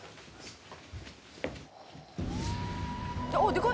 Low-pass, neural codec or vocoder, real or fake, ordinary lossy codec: none; none; real; none